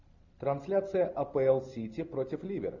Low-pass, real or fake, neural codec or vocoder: 7.2 kHz; real; none